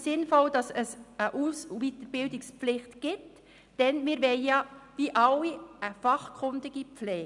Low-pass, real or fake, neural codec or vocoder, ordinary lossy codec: 10.8 kHz; real; none; none